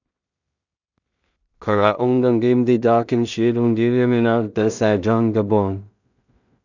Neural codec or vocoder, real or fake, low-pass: codec, 16 kHz in and 24 kHz out, 0.4 kbps, LongCat-Audio-Codec, two codebook decoder; fake; 7.2 kHz